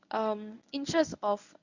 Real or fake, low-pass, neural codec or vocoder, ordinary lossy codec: fake; 7.2 kHz; codec, 24 kHz, 0.9 kbps, WavTokenizer, medium speech release version 1; none